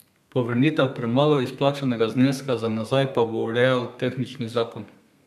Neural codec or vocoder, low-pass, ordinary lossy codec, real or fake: codec, 32 kHz, 1.9 kbps, SNAC; 14.4 kHz; none; fake